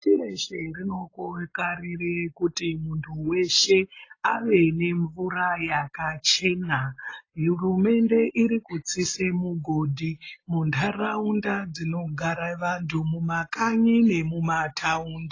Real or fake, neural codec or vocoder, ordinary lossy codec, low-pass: real; none; AAC, 32 kbps; 7.2 kHz